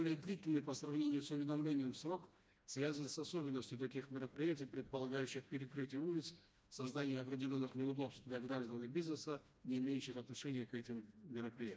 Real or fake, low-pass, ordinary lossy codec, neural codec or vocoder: fake; none; none; codec, 16 kHz, 1 kbps, FreqCodec, smaller model